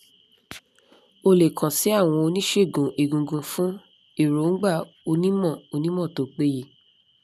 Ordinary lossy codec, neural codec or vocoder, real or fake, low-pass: none; none; real; 14.4 kHz